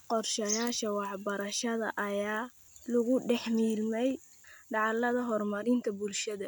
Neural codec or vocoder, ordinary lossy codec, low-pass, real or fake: none; none; none; real